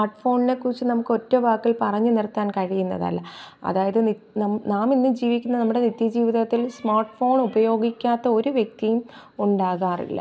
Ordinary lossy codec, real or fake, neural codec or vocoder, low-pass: none; real; none; none